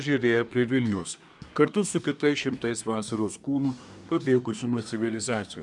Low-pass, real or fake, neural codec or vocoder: 10.8 kHz; fake; codec, 24 kHz, 1 kbps, SNAC